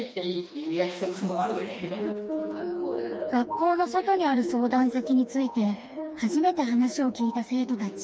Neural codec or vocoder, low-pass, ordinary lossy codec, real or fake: codec, 16 kHz, 2 kbps, FreqCodec, smaller model; none; none; fake